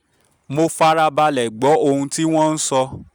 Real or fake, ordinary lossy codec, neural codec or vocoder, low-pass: real; none; none; none